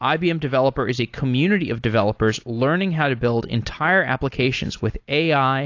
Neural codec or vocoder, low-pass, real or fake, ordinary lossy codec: none; 7.2 kHz; real; AAC, 48 kbps